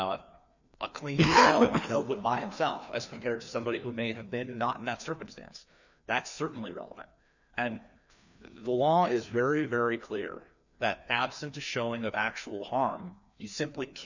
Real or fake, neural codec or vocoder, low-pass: fake; codec, 16 kHz, 2 kbps, FreqCodec, larger model; 7.2 kHz